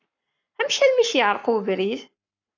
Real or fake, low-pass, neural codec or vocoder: fake; 7.2 kHz; codec, 44.1 kHz, 7.8 kbps, Pupu-Codec